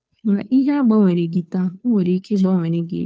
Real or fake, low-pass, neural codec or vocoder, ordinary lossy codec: fake; none; codec, 16 kHz, 2 kbps, FunCodec, trained on Chinese and English, 25 frames a second; none